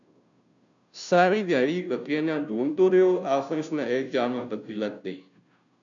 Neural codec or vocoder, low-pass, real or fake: codec, 16 kHz, 0.5 kbps, FunCodec, trained on Chinese and English, 25 frames a second; 7.2 kHz; fake